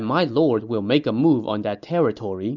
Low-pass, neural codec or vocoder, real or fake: 7.2 kHz; none; real